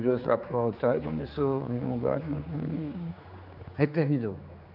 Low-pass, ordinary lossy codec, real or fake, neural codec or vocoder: 5.4 kHz; none; fake; codec, 16 kHz, 4 kbps, X-Codec, HuBERT features, trained on general audio